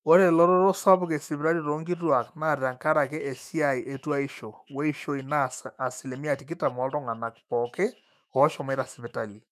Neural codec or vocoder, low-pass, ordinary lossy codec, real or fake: autoencoder, 48 kHz, 128 numbers a frame, DAC-VAE, trained on Japanese speech; 14.4 kHz; none; fake